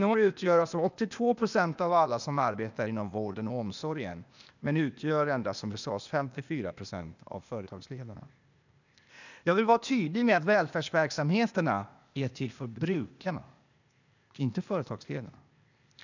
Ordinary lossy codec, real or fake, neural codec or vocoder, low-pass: none; fake; codec, 16 kHz, 0.8 kbps, ZipCodec; 7.2 kHz